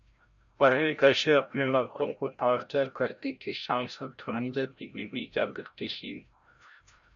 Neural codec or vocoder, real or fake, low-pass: codec, 16 kHz, 0.5 kbps, FreqCodec, larger model; fake; 7.2 kHz